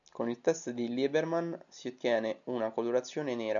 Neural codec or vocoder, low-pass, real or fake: none; 7.2 kHz; real